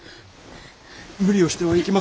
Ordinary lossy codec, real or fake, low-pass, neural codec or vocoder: none; real; none; none